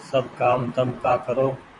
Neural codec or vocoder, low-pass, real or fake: vocoder, 44.1 kHz, 128 mel bands, Pupu-Vocoder; 10.8 kHz; fake